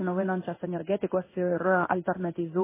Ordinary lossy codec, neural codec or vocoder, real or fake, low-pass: MP3, 16 kbps; codec, 16 kHz in and 24 kHz out, 1 kbps, XY-Tokenizer; fake; 3.6 kHz